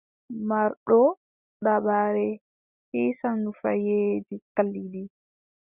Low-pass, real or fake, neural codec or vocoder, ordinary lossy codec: 3.6 kHz; real; none; Opus, 64 kbps